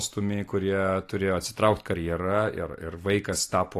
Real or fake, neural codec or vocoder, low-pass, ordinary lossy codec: real; none; 14.4 kHz; AAC, 48 kbps